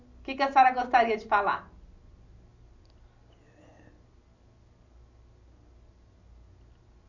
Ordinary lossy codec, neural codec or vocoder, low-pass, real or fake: none; none; 7.2 kHz; real